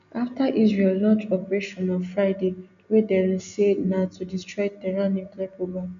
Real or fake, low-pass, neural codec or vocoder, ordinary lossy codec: real; 7.2 kHz; none; none